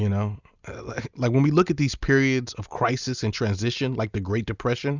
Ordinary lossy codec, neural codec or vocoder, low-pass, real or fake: Opus, 64 kbps; none; 7.2 kHz; real